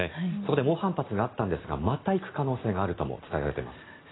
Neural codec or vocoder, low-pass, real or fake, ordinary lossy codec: none; 7.2 kHz; real; AAC, 16 kbps